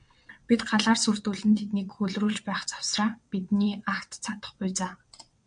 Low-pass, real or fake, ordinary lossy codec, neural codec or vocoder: 9.9 kHz; fake; MP3, 64 kbps; vocoder, 22.05 kHz, 80 mel bands, WaveNeXt